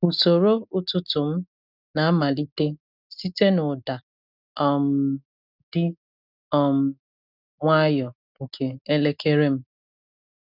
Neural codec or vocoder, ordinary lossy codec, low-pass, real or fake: none; none; 5.4 kHz; real